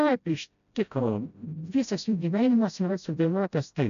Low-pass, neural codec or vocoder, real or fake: 7.2 kHz; codec, 16 kHz, 0.5 kbps, FreqCodec, smaller model; fake